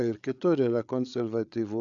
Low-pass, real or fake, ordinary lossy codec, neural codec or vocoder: 7.2 kHz; fake; MP3, 96 kbps; codec, 16 kHz, 8 kbps, FreqCodec, larger model